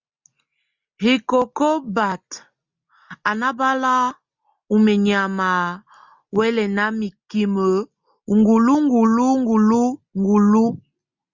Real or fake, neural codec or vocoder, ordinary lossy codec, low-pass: real; none; Opus, 64 kbps; 7.2 kHz